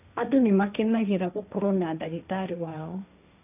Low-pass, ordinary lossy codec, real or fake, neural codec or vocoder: 3.6 kHz; none; fake; codec, 16 kHz, 1.1 kbps, Voila-Tokenizer